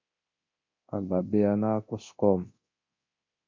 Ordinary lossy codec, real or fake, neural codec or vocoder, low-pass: MP3, 48 kbps; fake; codec, 24 kHz, 0.9 kbps, DualCodec; 7.2 kHz